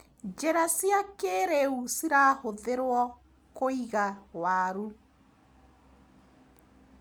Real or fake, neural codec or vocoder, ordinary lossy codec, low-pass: real; none; none; none